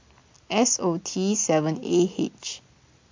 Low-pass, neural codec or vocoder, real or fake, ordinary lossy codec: 7.2 kHz; none; real; MP3, 48 kbps